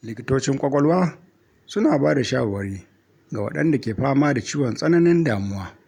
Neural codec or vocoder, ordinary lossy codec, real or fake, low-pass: none; none; real; 19.8 kHz